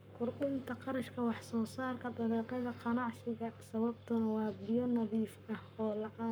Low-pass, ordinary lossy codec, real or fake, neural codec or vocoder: none; none; fake; codec, 44.1 kHz, 7.8 kbps, Pupu-Codec